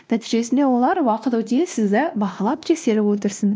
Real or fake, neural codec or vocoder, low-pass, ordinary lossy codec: fake; codec, 16 kHz, 1 kbps, X-Codec, WavLM features, trained on Multilingual LibriSpeech; none; none